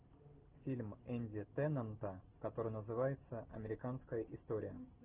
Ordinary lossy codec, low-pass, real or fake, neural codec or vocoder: Opus, 16 kbps; 3.6 kHz; real; none